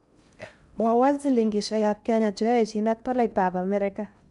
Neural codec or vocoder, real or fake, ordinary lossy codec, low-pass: codec, 16 kHz in and 24 kHz out, 0.6 kbps, FocalCodec, streaming, 4096 codes; fake; none; 10.8 kHz